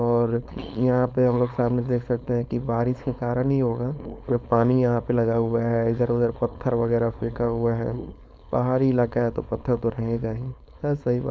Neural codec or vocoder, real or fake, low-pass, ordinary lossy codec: codec, 16 kHz, 4.8 kbps, FACodec; fake; none; none